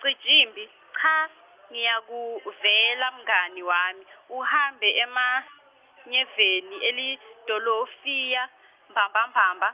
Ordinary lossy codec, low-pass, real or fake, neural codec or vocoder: Opus, 24 kbps; 3.6 kHz; real; none